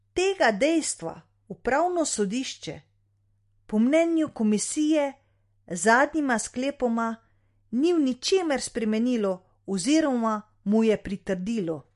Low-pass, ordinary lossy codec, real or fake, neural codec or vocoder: 14.4 kHz; MP3, 48 kbps; real; none